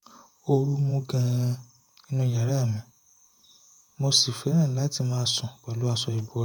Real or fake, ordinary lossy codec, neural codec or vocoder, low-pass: fake; none; vocoder, 48 kHz, 128 mel bands, Vocos; none